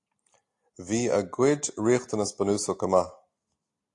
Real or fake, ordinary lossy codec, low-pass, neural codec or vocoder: real; AAC, 48 kbps; 10.8 kHz; none